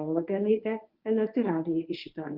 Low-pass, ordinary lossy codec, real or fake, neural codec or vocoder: 5.4 kHz; Opus, 32 kbps; fake; codec, 24 kHz, 0.9 kbps, WavTokenizer, medium speech release version 1